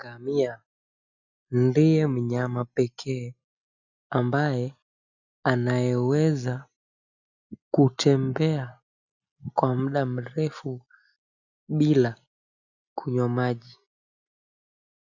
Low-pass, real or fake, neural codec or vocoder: 7.2 kHz; real; none